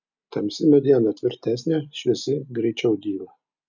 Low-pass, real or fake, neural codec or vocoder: 7.2 kHz; real; none